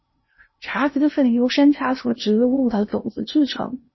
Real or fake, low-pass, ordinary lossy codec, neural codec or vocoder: fake; 7.2 kHz; MP3, 24 kbps; codec, 16 kHz in and 24 kHz out, 0.6 kbps, FocalCodec, streaming, 2048 codes